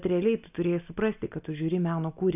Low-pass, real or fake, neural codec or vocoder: 3.6 kHz; real; none